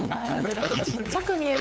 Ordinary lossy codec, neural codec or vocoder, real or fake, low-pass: none; codec, 16 kHz, 8 kbps, FunCodec, trained on LibriTTS, 25 frames a second; fake; none